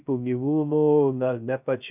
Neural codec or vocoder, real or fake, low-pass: codec, 16 kHz, 0.2 kbps, FocalCodec; fake; 3.6 kHz